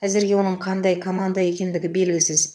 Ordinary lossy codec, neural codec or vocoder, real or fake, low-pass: none; vocoder, 22.05 kHz, 80 mel bands, HiFi-GAN; fake; none